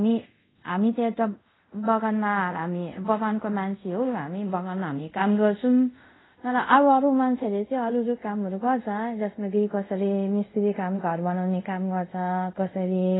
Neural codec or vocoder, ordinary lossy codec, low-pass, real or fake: codec, 24 kHz, 0.5 kbps, DualCodec; AAC, 16 kbps; 7.2 kHz; fake